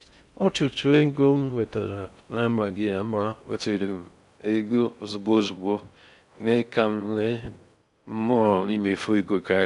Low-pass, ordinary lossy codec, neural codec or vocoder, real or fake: 10.8 kHz; none; codec, 16 kHz in and 24 kHz out, 0.6 kbps, FocalCodec, streaming, 4096 codes; fake